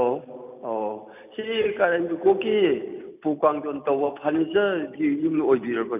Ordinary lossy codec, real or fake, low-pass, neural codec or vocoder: none; real; 3.6 kHz; none